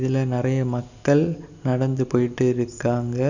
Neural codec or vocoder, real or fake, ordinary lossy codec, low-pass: none; real; none; 7.2 kHz